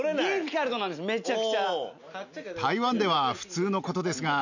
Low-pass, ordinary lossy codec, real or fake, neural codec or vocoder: 7.2 kHz; none; real; none